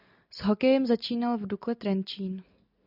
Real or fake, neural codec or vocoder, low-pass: real; none; 5.4 kHz